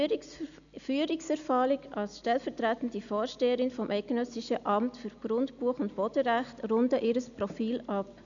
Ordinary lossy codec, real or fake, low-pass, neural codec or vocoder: none; real; 7.2 kHz; none